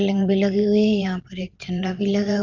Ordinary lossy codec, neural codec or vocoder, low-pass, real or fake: Opus, 24 kbps; vocoder, 44.1 kHz, 128 mel bands every 512 samples, BigVGAN v2; 7.2 kHz; fake